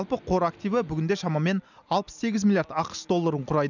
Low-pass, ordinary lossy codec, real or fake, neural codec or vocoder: 7.2 kHz; none; real; none